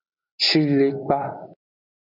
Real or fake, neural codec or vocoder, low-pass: real; none; 5.4 kHz